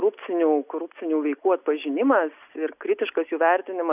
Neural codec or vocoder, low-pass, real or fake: none; 3.6 kHz; real